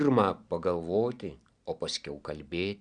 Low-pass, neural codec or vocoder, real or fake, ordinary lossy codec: 9.9 kHz; none; real; Opus, 64 kbps